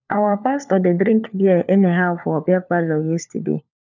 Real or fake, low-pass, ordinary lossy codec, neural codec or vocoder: fake; 7.2 kHz; none; codec, 16 kHz, 4 kbps, FunCodec, trained on LibriTTS, 50 frames a second